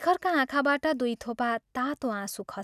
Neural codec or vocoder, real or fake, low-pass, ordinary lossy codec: none; real; 14.4 kHz; none